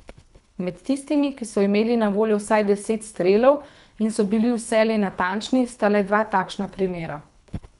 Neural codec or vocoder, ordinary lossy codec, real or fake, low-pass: codec, 24 kHz, 3 kbps, HILCodec; none; fake; 10.8 kHz